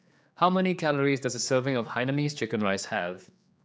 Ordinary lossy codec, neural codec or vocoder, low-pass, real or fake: none; codec, 16 kHz, 4 kbps, X-Codec, HuBERT features, trained on general audio; none; fake